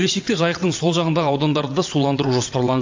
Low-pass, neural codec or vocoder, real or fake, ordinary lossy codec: 7.2 kHz; vocoder, 22.05 kHz, 80 mel bands, WaveNeXt; fake; AAC, 48 kbps